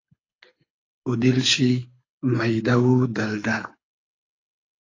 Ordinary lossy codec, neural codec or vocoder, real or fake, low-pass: AAC, 32 kbps; codec, 24 kHz, 6 kbps, HILCodec; fake; 7.2 kHz